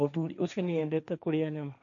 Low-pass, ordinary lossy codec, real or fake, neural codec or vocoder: 7.2 kHz; none; fake; codec, 16 kHz, 1.1 kbps, Voila-Tokenizer